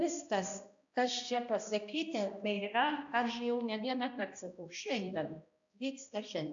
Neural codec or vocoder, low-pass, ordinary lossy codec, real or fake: codec, 16 kHz, 1 kbps, X-Codec, HuBERT features, trained on balanced general audio; 7.2 kHz; AAC, 64 kbps; fake